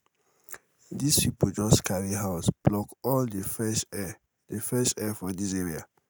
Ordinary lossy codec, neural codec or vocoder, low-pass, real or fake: none; none; none; real